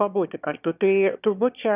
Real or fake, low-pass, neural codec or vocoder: fake; 3.6 kHz; autoencoder, 22.05 kHz, a latent of 192 numbers a frame, VITS, trained on one speaker